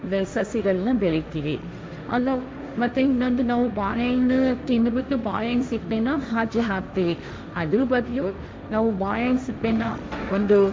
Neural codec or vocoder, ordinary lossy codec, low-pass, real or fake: codec, 16 kHz, 1.1 kbps, Voila-Tokenizer; none; none; fake